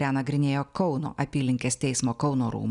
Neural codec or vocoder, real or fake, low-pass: none; real; 10.8 kHz